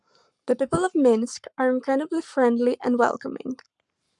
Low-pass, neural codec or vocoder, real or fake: 10.8 kHz; codec, 44.1 kHz, 7.8 kbps, Pupu-Codec; fake